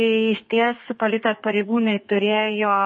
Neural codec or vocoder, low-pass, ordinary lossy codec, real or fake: codec, 32 kHz, 1.9 kbps, SNAC; 10.8 kHz; MP3, 32 kbps; fake